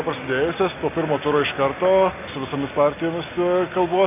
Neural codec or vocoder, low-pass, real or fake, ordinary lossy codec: none; 3.6 kHz; real; AAC, 32 kbps